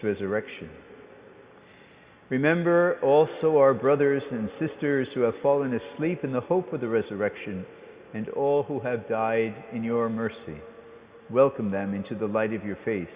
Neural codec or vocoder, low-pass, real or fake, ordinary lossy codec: none; 3.6 kHz; real; Opus, 64 kbps